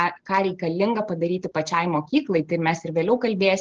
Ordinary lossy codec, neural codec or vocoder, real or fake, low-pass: Opus, 16 kbps; none; real; 7.2 kHz